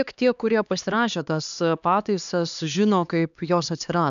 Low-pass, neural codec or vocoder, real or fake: 7.2 kHz; codec, 16 kHz, 2 kbps, X-Codec, HuBERT features, trained on LibriSpeech; fake